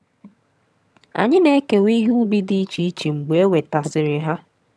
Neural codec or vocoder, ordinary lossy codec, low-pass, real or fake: vocoder, 22.05 kHz, 80 mel bands, HiFi-GAN; none; none; fake